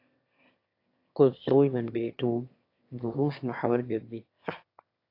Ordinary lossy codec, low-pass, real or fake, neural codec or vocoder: AAC, 32 kbps; 5.4 kHz; fake; autoencoder, 22.05 kHz, a latent of 192 numbers a frame, VITS, trained on one speaker